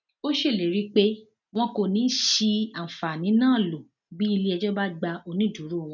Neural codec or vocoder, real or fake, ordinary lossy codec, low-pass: none; real; none; 7.2 kHz